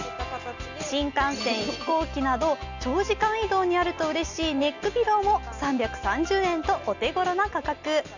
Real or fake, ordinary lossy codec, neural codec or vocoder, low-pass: real; none; none; 7.2 kHz